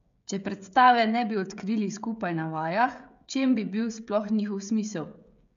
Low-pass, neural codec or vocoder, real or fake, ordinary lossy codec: 7.2 kHz; codec, 16 kHz, 16 kbps, FreqCodec, smaller model; fake; MP3, 64 kbps